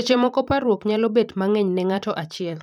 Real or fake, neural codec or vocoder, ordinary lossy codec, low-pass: fake; vocoder, 44.1 kHz, 128 mel bands every 512 samples, BigVGAN v2; none; 19.8 kHz